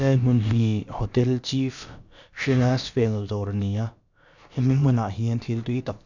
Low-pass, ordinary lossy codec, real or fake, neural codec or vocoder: 7.2 kHz; none; fake; codec, 16 kHz, about 1 kbps, DyCAST, with the encoder's durations